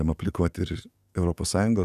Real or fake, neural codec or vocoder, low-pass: fake; codec, 44.1 kHz, 7.8 kbps, DAC; 14.4 kHz